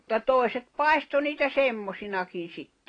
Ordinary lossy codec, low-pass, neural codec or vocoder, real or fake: AAC, 32 kbps; 9.9 kHz; none; real